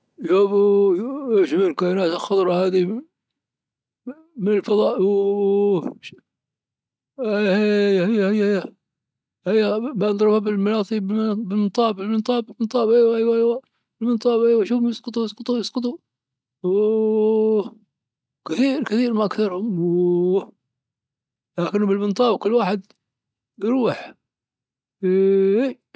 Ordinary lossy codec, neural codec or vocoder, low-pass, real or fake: none; none; none; real